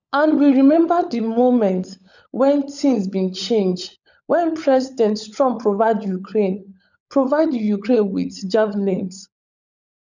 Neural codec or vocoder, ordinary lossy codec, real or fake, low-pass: codec, 16 kHz, 16 kbps, FunCodec, trained on LibriTTS, 50 frames a second; none; fake; 7.2 kHz